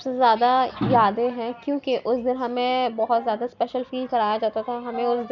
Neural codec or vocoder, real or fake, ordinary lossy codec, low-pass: none; real; none; 7.2 kHz